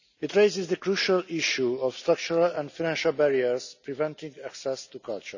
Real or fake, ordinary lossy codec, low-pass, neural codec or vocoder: real; none; 7.2 kHz; none